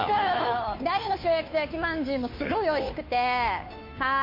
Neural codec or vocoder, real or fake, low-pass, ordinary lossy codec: codec, 16 kHz, 2 kbps, FunCodec, trained on Chinese and English, 25 frames a second; fake; 5.4 kHz; MP3, 32 kbps